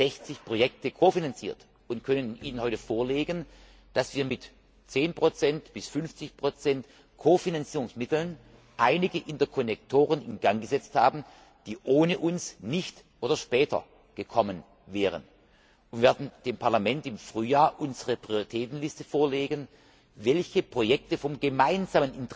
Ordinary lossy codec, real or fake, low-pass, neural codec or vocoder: none; real; none; none